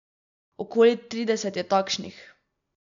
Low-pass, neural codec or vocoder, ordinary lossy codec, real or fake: 7.2 kHz; none; none; real